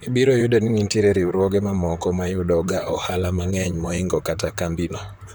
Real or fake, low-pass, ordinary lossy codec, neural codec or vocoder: fake; none; none; vocoder, 44.1 kHz, 128 mel bands, Pupu-Vocoder